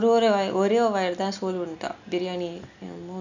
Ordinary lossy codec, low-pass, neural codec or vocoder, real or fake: none; 7.2 kHz; none; real